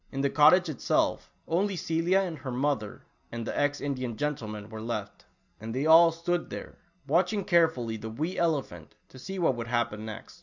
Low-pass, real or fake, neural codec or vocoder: 7.2 kHz; real; none